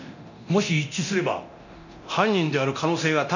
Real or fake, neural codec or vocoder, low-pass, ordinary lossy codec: fake; codec, 24 kHz, 0.9 kbps, DualCodec; 7.2 kHz; none